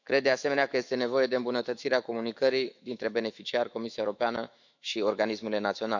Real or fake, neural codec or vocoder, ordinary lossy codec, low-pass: fake; autoencoder, 48 kHz, 128 numbers a frame, DAC-VAE, trained on Japanese speech; none; 7.2 kHz